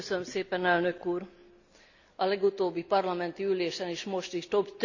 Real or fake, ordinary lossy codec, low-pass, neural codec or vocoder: real; none; 7.2 kHz; none